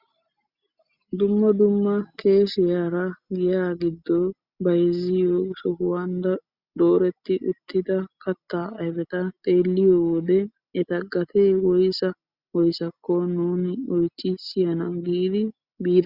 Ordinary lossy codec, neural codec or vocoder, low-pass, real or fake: Opus, 64 kbps; none; 5.4 kHz; real